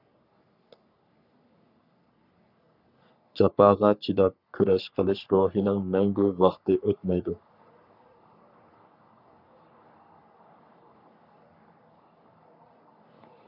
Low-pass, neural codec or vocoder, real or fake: 5.4 kHz; codec, 44.1 kHz, 3.4 kbps, Pupu-Codec; fake